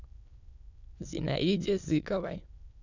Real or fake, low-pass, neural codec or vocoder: fake; 7.2 kHz; autoencoder, 22.05 kHz, a latent of 192 numbers a frame, VITS, trained on many speakers